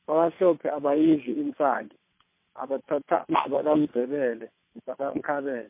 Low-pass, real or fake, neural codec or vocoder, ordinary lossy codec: 3.6 kHz; fake; vocoder, 22.05 kHz, 80 mel bands, WaveNeXt; MP3, 24 kbps